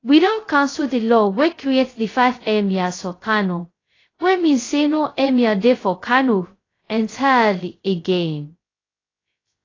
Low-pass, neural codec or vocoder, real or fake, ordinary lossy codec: 7.2 kHz; codec, 16 kHz, 0.2 kbps, FocalCodec; fake; AAC, 32 kbps